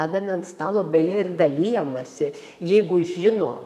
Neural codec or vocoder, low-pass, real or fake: codec, 32 kHz, 1.9 kbps, SNAC; 14.4 kHz; fake